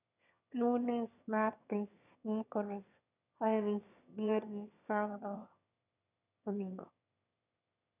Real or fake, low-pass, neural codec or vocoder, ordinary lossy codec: fake; 3.6 kHz; autoencoder, 22.05 kHz, a latent of 192 numbers a frame, VITS, trained on one speaker; none